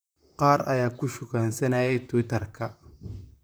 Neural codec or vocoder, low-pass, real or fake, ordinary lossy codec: vocoder, 44.1 kHz, 128 mel bands, Pupu-Vocoder; none; fake; none